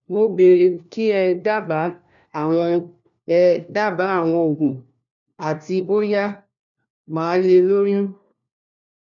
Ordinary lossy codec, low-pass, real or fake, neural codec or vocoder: none; 7.2 kHz; fake; codec, 16 kHz, 1 kbps, FunCodec, trained on LibriTTS, 50 frames a second